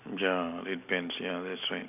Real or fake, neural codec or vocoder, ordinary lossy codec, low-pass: real; none; none; 3.6 kHz